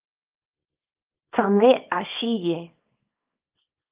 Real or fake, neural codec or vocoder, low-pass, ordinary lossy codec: fake; codec, 24 kHz, 0.9 kbps, WavTokenizer, small release; 3.6 kHz; Opus, 24 kbps